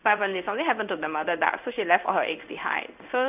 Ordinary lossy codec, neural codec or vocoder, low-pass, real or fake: none; codec, 16 kHz in and 24 kHz out, 1 kbps, XY-Tokenizer; 3.6 kHz; fake